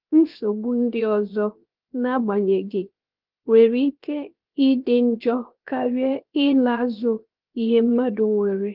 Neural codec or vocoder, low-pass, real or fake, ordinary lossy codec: codec, 16 kHz, 0.7 kbps, FocalCodec; 5.4 kHz; fake; Opus, 32 kbps